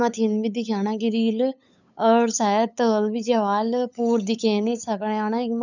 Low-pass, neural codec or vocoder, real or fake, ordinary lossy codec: 7.2 kHz; codec, 16 kHz, 8 kbps, FunCodec, trained on LibriTTS, 25 frames a second; fake; none